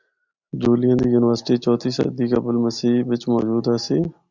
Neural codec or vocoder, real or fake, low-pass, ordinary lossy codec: none; real; 7.2 kHz; Opus, 64 kbps